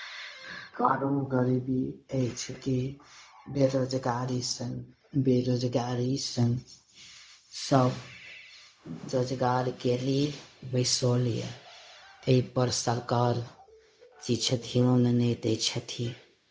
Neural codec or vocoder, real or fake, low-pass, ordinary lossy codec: codec, 16 kHz, 0.4 kbps, LongCat-Audio-Codec; fake; none; none